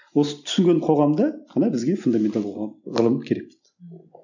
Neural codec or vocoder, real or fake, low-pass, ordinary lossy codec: none; real; 7.2 kHz; none